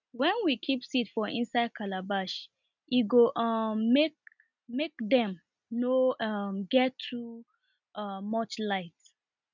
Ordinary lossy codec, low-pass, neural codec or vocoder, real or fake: none; 7.2 kHz; none; real